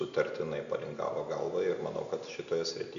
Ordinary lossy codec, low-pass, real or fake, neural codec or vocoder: AAC, 96 kbps; 7.2 kHz; real; none